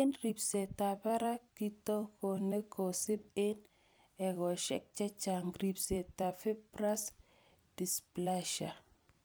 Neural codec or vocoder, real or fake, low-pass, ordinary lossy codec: vocoder, 44.1 kHz, 128 mel bands every 256 samples, BigVGAN v2; fake; none; none